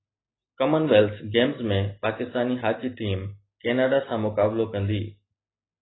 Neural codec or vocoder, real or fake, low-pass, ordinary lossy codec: none; real; 7.2 kHz; AAC, 16 kbps